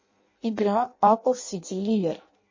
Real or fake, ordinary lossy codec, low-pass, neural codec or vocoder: fake; MP3, 32 kbps; 7.2 kHz; codec, 16 kHz in and 24 kHz out, 0.6 kbps, FireRedTTS-2 codec